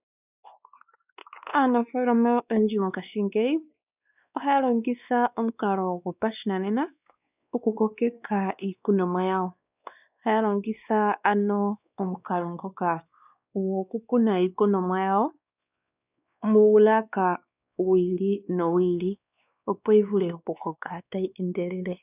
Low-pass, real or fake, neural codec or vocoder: 3.6 kHz; fake; codec, 16 kHz, 2 kbps, X-Codec, WavLM features, trained on Multilingual LibriSpeech